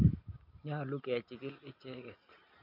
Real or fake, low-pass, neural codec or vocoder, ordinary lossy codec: real; 5.4 kHz; none; none